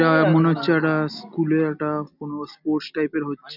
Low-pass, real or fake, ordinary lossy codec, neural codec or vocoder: 5.4 kHz; real; none; none